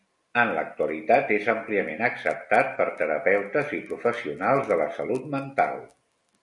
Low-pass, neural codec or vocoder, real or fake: 10.8 kHz; none; real